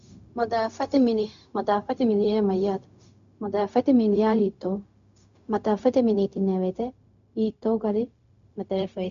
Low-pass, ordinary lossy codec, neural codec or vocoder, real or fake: 7.2 kHz; MP3, 64 kbps; codec, 16 kHz, 0.4 kbps, LongCat-Audio-Codec; fake